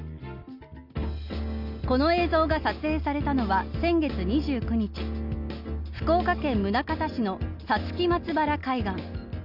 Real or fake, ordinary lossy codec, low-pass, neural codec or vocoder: real; none; 5.4 kHz; none